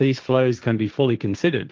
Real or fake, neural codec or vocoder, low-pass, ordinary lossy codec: fake; codec, 16 kHz, 1.1 kbps, Voila-Tokenizer; 7.2 kHz; Opus, 24 kbps